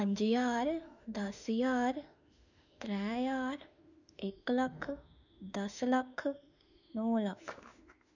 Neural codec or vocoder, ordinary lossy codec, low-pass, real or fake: autoencoder, 48 kHz, 32 numbers a frame, DAC-VAE, trained on Japanese speech; none; 7.2 kHz; fake